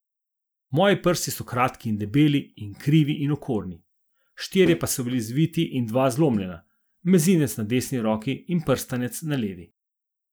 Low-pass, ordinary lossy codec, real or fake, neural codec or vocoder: none; none; real; none